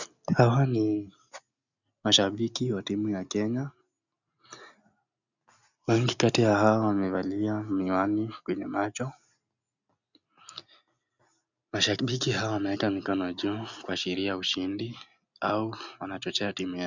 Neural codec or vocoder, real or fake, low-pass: none; real; 7.2 kHz